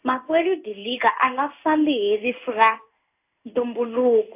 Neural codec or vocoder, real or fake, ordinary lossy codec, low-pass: codec, 16 kHz in and 24 kHz out, 1 kbps, XY-Tokenizer; fake; AAC, 32 kbps; 3.6 kHz